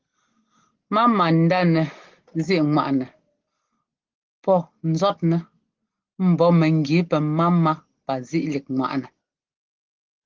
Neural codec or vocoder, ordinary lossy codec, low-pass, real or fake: none; Opus, 16 kbps; 7.2 kHz; real